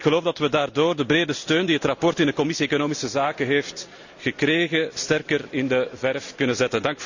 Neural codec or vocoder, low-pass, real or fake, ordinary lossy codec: none; 7.2 kHz; real; none